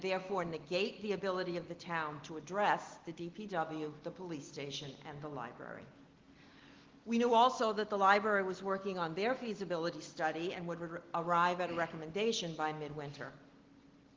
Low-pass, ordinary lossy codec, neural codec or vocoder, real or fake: 7.2 kHz; Opus, 16 kbps; none; real